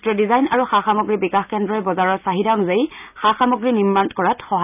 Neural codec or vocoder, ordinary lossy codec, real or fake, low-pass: none; none; real; 3.6 kHz